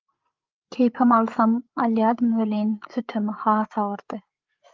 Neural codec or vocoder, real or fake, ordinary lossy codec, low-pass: codec, 16 kHz, 8 kbps, FreqCodec, larger model; fake; Opus, 24 kbps; 7.2 kHz